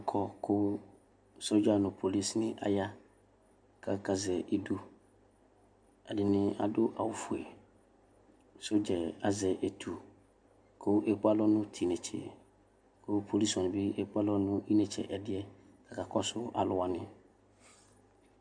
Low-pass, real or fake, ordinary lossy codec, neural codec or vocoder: 9.9 kHz; real; MP3, 64 kbps; none